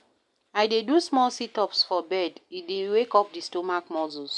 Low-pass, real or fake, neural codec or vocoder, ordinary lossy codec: 10.8 kHz; real; none; MP3, 96 kbps